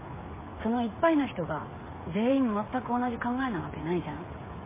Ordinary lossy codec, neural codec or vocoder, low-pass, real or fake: MP3, 16 kbps; codec, 16 kHz, 8 kbps, FreqCodec, smaller model; 3.6 kHz; fake